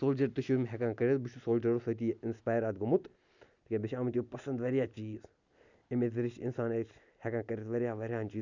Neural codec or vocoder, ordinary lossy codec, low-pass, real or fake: none; none; 7.2 kHz; real